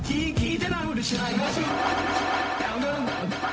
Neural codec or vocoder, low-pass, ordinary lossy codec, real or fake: codec, 16 kHz, 0.4 kbps, LongCat-Audio-Codec; none; none; fake